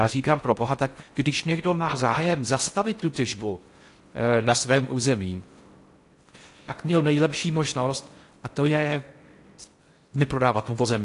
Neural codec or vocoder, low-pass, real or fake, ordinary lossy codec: codec, 16 kHz in and 24 kHz out, 0.6 kbps, FocalCodec, streaming, 4096 codes; 10.8 kHz; fake; AAC, 48 kbps